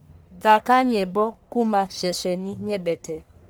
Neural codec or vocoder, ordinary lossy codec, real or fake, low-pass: codec, 44.1 kHz, 1.7 kbps, Pupu-Codec; none; fake; none